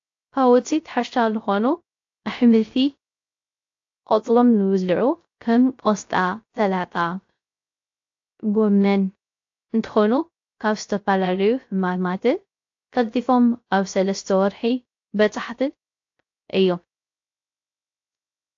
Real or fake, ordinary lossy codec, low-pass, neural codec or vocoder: fake; AAC, 48 kbps; 7.2 kHz; codec, 16 kHz, 0.3 kbps, FocalCodec